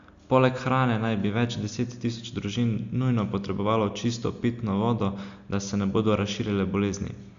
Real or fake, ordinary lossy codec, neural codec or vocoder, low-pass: real; Opus, 64 kbps; none; 7.2 kHz